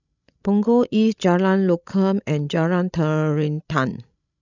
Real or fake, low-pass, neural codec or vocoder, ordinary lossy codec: fake; 7.2 kHz; codec, 16 kHz, 16 kbps, FreqCodec, larger model; none